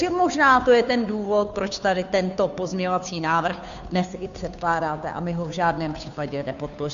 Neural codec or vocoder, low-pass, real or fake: codec, 16 kHz, 2 kbps, FunCodec, trained on Chinese and English, 25 frames a second; 7.2 kHz; fake